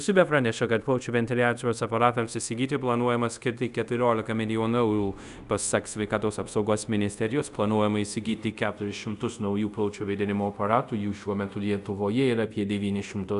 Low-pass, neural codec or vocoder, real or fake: 10.8 kHz; codec, 24 kHz, 0.5 kbps, DualCodec; fake